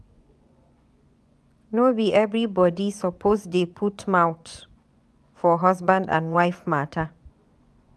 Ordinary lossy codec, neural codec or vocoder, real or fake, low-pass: none; vocoder, 24 kHz, 100 mel bands, Vocos; fake; none